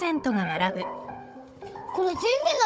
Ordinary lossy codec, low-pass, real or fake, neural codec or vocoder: none; none; fake; codec, 16 kHz, 16 kbps, FunCodec, trained on Chinese and English, 50 frames a second